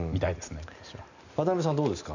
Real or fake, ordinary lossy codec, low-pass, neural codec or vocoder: real; none; 7.2 kHz; none